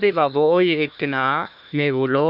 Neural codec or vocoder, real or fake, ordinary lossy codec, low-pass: codec, 16 kHz, 1 kbps, FunCodec, trained on Chinese and English, 50 frames a second; fake; none; 5.4 kHz